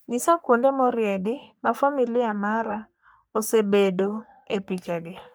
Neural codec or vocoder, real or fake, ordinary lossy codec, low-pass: codec, 44.1 kHz, 3.4 kbps, Pupu-Codec; fake; none; none